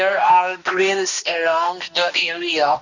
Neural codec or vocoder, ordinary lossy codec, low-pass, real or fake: codec, 16 kHz, 1 kbps, X-Codec, HuBERT features, trained on balanced general audio; none; 7.2 kHz; fake